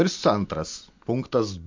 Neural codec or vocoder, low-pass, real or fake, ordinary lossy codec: none; 7.2 kHz; real; MP3, 48 kbps